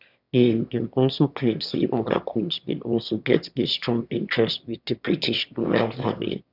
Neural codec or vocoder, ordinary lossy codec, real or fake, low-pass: autoencoder, 22.05 kHz, a latent of 192 numbers a frame, VITS, trained on one speaker; none; fake; 5.4 kHz